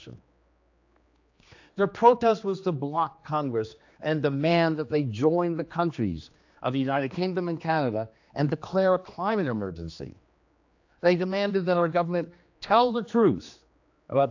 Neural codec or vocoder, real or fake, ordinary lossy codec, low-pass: codec, 16 kHz, 2 kbps, X-Codec, HuBERT features, trained on general audio; fake; AAC, 48 kbps; 7.2 kHz